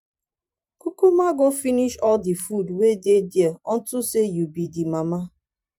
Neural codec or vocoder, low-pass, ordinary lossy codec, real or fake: vocoder, 44.1 kHz, 128 mel bands every 512 samples, BigVGAN v2; 19.8 kHz; none; fake